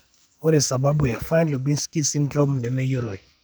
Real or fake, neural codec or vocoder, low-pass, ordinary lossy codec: fake; codec, 44.1 kHz, 2.6 kbps, SNAC; none; none